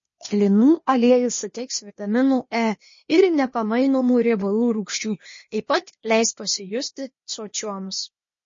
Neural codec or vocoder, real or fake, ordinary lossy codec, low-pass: codec, 16 kHz, 0.8 kbps, ZipCodec; fake; MP3, 32 kbps; 7.2 kHz